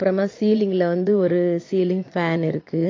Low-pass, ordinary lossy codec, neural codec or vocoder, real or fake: 7.2 kHz; AAC, 32 kbps; vocoder, 22.05 kHz, 80 mel bands, Vocos; fake